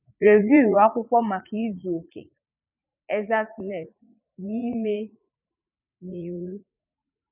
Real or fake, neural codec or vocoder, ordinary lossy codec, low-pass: fake; vocoder, 44.1 kHz, 80 mel bands, Vocos; none; 3.6 kHz